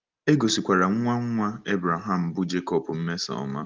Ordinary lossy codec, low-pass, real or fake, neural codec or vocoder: Opus, 32 kbps; 7.2 kHz; real; none